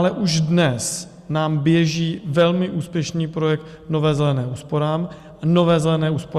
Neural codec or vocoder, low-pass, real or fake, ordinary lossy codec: none; 14.4 kHz; real; Opus, 64 kbps